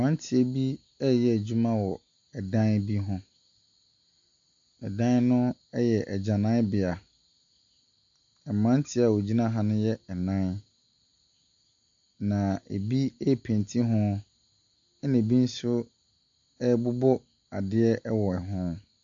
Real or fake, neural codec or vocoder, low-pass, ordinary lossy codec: real; none; 7.2 kHz; AAC, 64 kbps